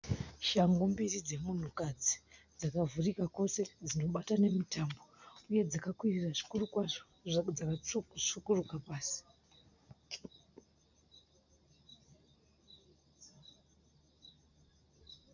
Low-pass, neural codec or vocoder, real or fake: 7.2 kHz; none; real